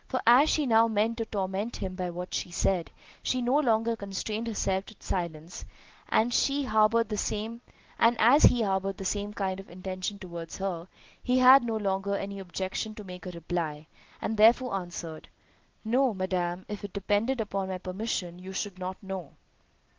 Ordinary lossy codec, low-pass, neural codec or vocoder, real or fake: Opus, 16 kbps; 7.2 kHz; none; real